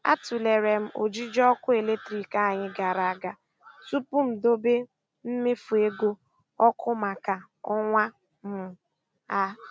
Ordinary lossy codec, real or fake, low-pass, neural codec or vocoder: none; real; none; none